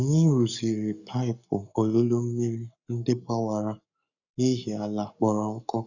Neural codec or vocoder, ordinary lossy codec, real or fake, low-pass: codec, 44.1 kHz, 7.8 kbps, Pupu-Codec; none; fake; 7.2 kHz